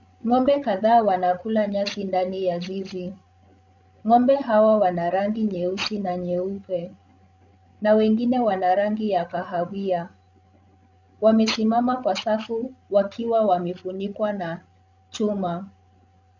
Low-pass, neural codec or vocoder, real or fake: 7.2 kHz; codec, 16 kHz, 16 kbps, FreqCodec, larger model; fake